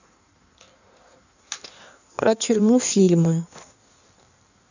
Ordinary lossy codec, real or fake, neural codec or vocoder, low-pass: none; fake; codec, 16 kHz in and 24 kHz out, 1.1 kbps, FireRedTTS-2 codec; 7.2 kHz